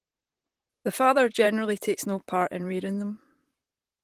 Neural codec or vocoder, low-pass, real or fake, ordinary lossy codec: vocoder, 44.1 kHz, 128 mel bands, Pupu-Vocoder; 14.4 kHz; fake; Opus, 24 kbps